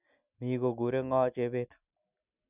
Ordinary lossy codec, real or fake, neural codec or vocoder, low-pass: none; real; none; 3.6 kHz